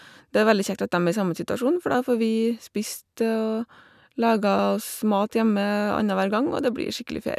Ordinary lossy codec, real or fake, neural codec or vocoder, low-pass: none; real; none; 14.4 kHz